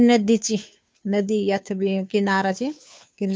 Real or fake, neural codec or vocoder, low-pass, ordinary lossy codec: fake; codec, 16 kHz, 2 kbps, FunCodec, trained on Chinese and English, 25 frames a second; none; none